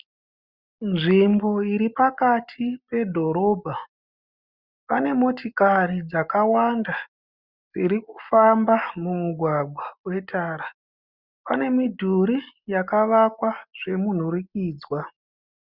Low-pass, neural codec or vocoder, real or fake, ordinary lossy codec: 5.4 kHz; none; real; Opus, 64 kbps